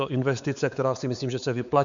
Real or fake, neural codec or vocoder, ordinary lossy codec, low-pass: fake; codec, 16 kHz, 4 kbps, X-Codec, WavLM features, trained on Multilingual LibriSpeech; MP3, 96 kbps; 7.2 kHz